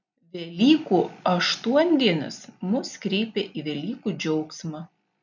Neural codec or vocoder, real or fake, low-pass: none; real; 7.2 kHz